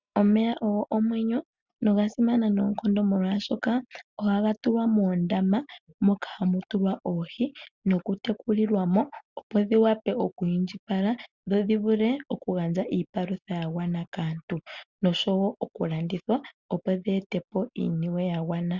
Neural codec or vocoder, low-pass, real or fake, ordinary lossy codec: none; 7.2 kHz; real; Opus, 64 kbps